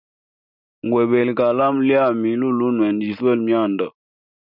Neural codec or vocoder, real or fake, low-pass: none; real; 5.4 kHz